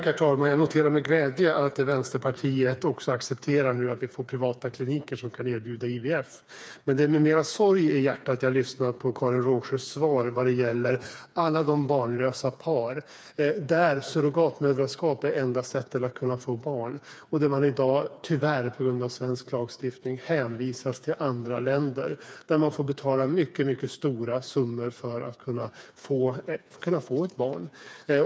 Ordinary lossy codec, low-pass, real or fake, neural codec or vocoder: none; none; fake; codec, 16 kHz, 4 kbps, FreqCodec, smaller model